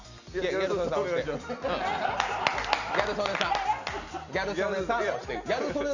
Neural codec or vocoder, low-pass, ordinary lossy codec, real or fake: none; 7.2 kHz; none; real